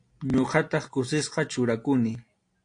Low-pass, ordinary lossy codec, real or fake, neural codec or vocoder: 9.9 kHz; AAC, 48 kbps; real; none